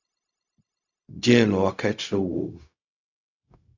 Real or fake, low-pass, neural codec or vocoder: fake; 7.2 kHz; codec, 16 kHz, 0.4 kbps, LongCat-Audio-Codec